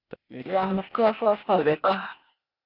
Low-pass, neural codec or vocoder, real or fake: 5.4 kHz; codec, 16 kHz, 0.8 kbps, ZipCodec; fake